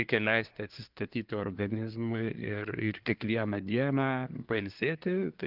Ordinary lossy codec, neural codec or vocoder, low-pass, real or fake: Opus, 32 kbps; codec, 24 kHz, 1 kbps, SNAC; 5.4 kHz; fake